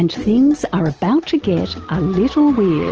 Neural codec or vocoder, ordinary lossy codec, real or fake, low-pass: none; Opus, 16 kbps; real; 7.2 kHz